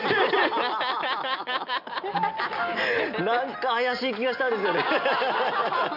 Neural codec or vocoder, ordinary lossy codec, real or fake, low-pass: none; none; real; 5.4 kHz